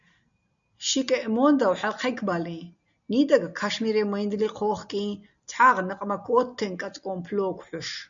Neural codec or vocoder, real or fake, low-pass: none; real; 7.2 kHz